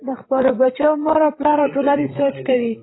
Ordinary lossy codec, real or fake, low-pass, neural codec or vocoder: AAC, 16 kbps; fake; 7.2 kHz; codec, 16 kHz, 8 kbps, FreqCodec, larger model